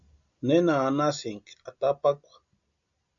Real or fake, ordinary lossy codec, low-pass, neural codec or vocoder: real; MP3, 64 kbps; 7.2 kHz; none